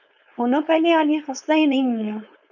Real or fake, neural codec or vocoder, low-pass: fake; codec, 16 kHz, 4.8 kbps, FACodec; 7.2 kHz